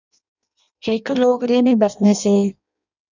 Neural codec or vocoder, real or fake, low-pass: codec, 16 kHz in and 24 kHz out, 0.6 kbps, FireRedTTS-2 codec; fake; 7.2 kHz